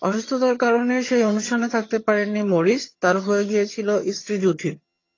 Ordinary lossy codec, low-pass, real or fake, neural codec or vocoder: AAC, 32 kbps; 7.2 kHz; fake; vocoder, 22.05 kHz, 80 mel bands, HiFi-GAN